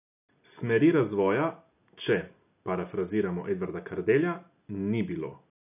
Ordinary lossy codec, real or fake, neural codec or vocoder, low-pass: none; real; none; 3.6 kHz